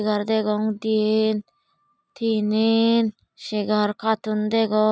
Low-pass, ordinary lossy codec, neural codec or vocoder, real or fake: none; none; none; real